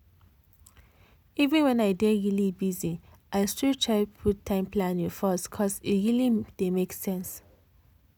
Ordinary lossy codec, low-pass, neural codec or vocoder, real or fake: none; none; none; real